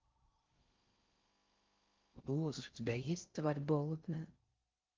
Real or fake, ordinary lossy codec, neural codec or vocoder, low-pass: fake; Opus, 32 kbps; codec, 16 kHz in and 24 kHz out, 0.6 kbps, FocalCodec, streaming, 4096 codes; 7.2 kHz